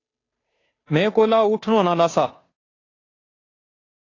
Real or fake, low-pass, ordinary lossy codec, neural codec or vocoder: fake; 7.2 kHz; AAC, 32 kbps; codec, 16 kHz, 2 kbps, FunCodec, trained on Chinese and English, 25 frames a second